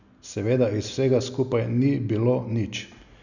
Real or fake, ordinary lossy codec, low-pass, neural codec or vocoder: real; none; 7.2 kHz; none